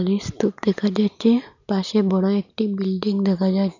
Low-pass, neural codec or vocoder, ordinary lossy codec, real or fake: 7.2 kHz; none; none; real